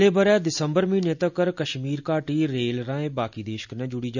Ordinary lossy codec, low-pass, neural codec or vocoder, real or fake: none; 7.2 kHz; none; real